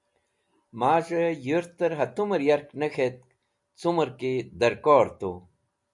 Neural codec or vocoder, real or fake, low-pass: none; real; 10.8 kHz